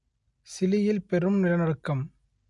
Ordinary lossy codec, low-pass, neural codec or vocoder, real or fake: MP3, 48 kbps; 10.8 kHz; none; real